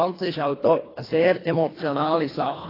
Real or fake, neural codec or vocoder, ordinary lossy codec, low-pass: fake; codec, 24 kHz, 1.5 kbps, HILCodec; AAC, 24 kbps; 5.4 kHz